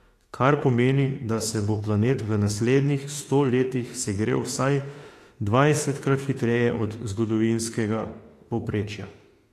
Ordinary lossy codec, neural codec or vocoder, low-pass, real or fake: AAC, 48 kbps; autoencoder, 48 kHz, 32 numbers a frame, DAC-VAE, trained on Japanese speech; 14.4 kHz; fake